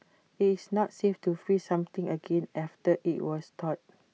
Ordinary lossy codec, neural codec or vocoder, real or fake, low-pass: none; none; real; none